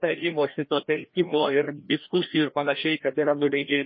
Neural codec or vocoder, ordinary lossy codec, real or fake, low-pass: codec, 16 kHz, 1 kbps, FreqCodec, larger model; MP3, 24 kbps; fake; 7.2 kHz